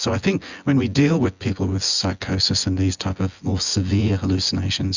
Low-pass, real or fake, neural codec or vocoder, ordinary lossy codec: 7.2 kHz; fake; vocoder, 24 kHz, 100 mel bands, Vocos; Opus, 64 kbps